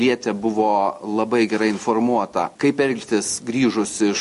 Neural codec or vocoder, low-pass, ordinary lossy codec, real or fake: none; 14.4 kHz; MP3, 48 kbps; real